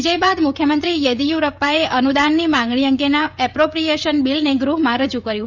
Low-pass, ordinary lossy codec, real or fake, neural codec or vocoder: 7.2 kHz; none; fake; codec, 16 kHz, 16 kbps, FreqCodec, smaller model